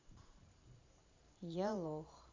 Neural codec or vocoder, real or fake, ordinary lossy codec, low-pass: vocoder, 44.1 kHz, 128 mel bands every 512 samples, BigVGAN v2; fake; MP3, 64 kbps; 7.2 kHz